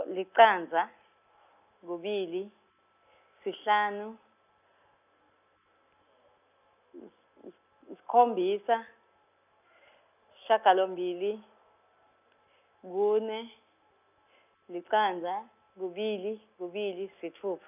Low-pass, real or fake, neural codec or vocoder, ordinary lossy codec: 3.6 kHz; real; none; none